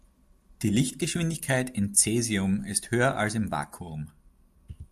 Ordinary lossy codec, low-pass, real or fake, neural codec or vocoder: AAC, 96 kbps; 14.4 kHz; real; none